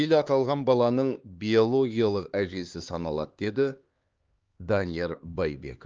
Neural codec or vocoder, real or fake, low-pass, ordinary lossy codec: codec, 16 kHz, 2 kbps, X-Codec, HuBERT features, trained on LibriSpeech; fake; 7.2 kHz; Opus, 24 kbps